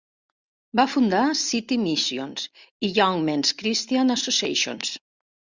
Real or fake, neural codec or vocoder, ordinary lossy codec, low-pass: real; none; Opus, 64 kbps; 7.2 kHz